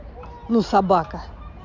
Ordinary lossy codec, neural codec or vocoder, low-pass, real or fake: none; none; 7.2 kHz; real